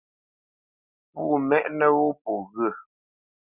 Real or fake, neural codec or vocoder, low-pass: real; none; 3.6 kHz